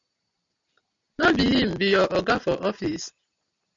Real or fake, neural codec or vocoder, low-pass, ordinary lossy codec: real; none; 7.2 kHz; MP3, 64 kbps